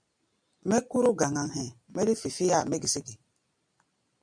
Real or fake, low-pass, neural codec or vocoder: real; 9.9 kHz; none